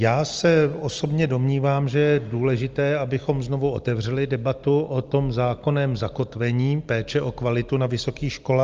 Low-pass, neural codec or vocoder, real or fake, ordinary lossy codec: 7.2 kHz; none; real; Opus, 24 kbps